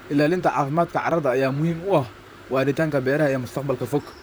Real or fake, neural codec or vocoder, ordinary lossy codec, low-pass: fake; vocoder, 44.1 kHz, 128 mel bands, Pupu-Vocoder; none; none